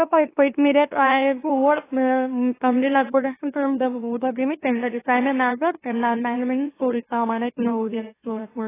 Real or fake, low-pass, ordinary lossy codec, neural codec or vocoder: fake; 3.6 kHz; AAC, 16 kbps; autoencoder, 44.1 kHz, a latent of 192 numbers a frame, MeloTTS